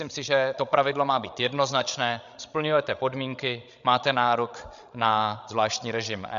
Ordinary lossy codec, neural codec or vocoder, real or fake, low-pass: MP3, 96 kbps; codec, 16 kHz, 16 kbps, FreqCodec, larger model; fake; 7.2 kHz